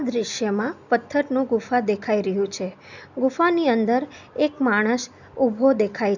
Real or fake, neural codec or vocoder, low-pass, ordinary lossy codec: real; none; 7.2 kHz; none